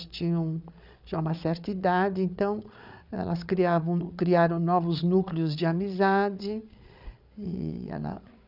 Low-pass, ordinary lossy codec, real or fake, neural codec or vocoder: 5.4 kHz; none; fake; codec, 16 kHz, 8 kbps, FreqCodec, larger model